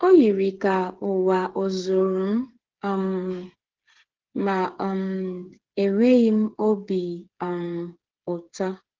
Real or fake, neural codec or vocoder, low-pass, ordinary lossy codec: fake; codec, 16 kHz, 8 kbps, FreqCodec, smaller model; 7.2 kHz; Opus, 16 kbps